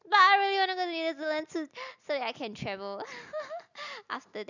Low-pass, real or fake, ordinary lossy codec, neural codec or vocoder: 7.2 kHz; real; none; none